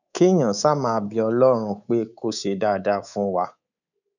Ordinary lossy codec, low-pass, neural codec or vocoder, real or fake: none; 7.2 kHz; codec, 24 kHz, 3.1 kbps, DualCodec; fake